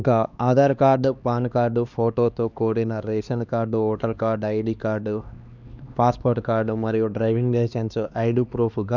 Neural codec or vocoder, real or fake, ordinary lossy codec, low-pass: codec, 16 kHz, 2 kbps, X-Codec, HuBERT features, trained on LibriSpeech; fake; none; 7.2 kHz